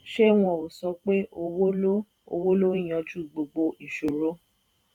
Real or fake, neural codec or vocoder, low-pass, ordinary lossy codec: fake; vocoder, 44.1 kHz, 128 mel bands every 512 samples, BigVGAN v2; 19.8 kHz; none